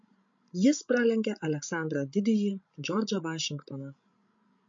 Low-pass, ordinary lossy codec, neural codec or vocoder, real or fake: 7.2 kHz; MP3, 48 kbps; codec, 16 kHz, 16 kbps, FreqCodec, larger model; fake